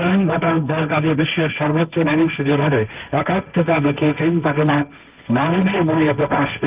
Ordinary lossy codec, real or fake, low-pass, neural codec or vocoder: Opus, 24 kbps; fake; 3.6 kHz; codec, 16 kHz, 1.1 kbps, Voila-Tokenizer